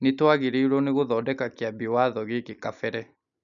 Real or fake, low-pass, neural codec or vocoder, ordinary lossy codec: real; 7.2 kHz; none; none